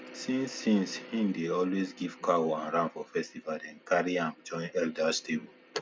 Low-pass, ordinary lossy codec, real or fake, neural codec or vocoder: none; none; real; none